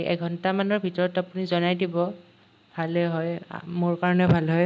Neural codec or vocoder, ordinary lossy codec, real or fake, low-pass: none; none; real; none